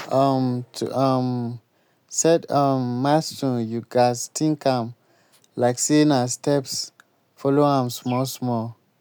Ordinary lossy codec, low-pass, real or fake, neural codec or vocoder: none; none; real; none